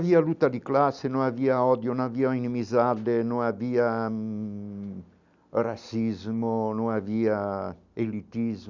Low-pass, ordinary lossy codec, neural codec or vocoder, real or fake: 7.2 kHz; none; none; real